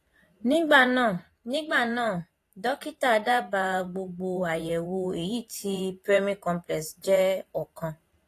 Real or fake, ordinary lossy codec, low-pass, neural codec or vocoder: fake; AAC, 48 kbps; 14.4 kHz; vocoder, 44.1 kHz, 128 mel bands every 512 samples, BigVGAN v2